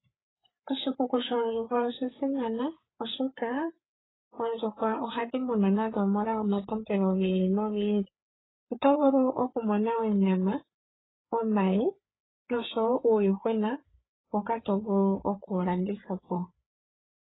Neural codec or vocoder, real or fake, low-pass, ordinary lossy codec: codec, 16 kHz, 4 kbps, FreqCodec, larger model; fake; 7.2 kHz; AAC, 16 kbps